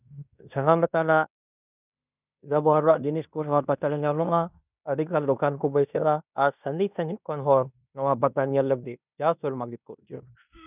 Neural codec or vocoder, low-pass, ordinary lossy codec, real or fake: codec, 16 kHz in and 24 kHz out, 0.9 kbps, LongCat-Audio-Codec, fine tuned four codebook decoder; 3.6 kHz; none; fake